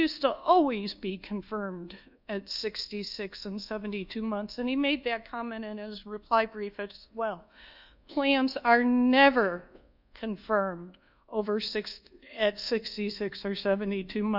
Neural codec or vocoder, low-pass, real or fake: codec, 24 kHz, 1.2 kbps, DualCodec; 5.4 kHz; fake